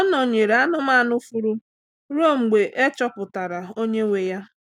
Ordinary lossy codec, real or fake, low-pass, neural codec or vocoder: none; real; 19.8 kHz; none